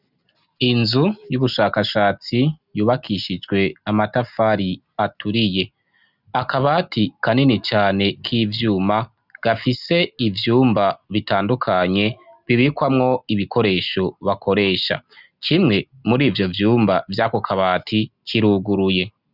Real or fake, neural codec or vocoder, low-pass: real; none; 5.4 kHz